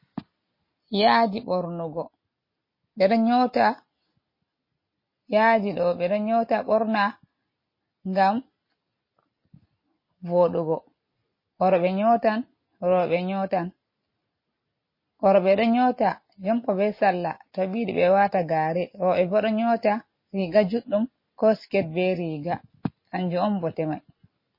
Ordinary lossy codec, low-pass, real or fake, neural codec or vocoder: MP3, 24 kbps; 5.4 kHz; real; none